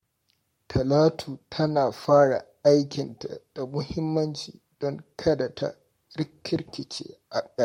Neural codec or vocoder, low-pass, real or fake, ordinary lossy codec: codec, 44.1 kHz, 7.8 kbps, Pupu-Codec; 19.8 kHz; fake; MP3, 64 kbps